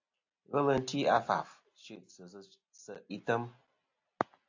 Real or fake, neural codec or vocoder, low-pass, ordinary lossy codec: real; none; 7.2 kHz; AAC, 48 kbps